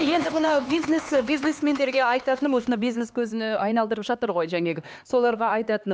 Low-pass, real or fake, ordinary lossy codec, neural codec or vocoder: none; fake; none; codec, 16 kHz, 2 kbps, X-Codec, HuBERT features, trained on LibriSpeech